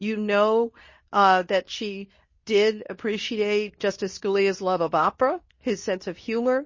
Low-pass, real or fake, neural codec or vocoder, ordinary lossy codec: 7.2 kHz; fake; codec, 24 kHz, 0.9 kbps, WavTokenizer, medium speech release version 2; MP3, 32 kbps